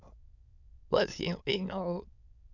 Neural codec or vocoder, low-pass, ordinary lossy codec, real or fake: autoencoder, 22.05 kHz, a latent of 192 numbers a frame, VITS, trained on many speakers; 7.2 kHz; none; fake